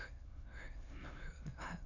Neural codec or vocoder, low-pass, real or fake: autoencoder, 22.05 kHz, a latent of 192 numbers a frame, VITS, trained on many speakers; 7.2 kHz; fake